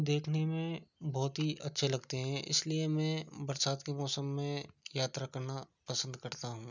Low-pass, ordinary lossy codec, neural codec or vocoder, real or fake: 7.2 kHz; none; none; real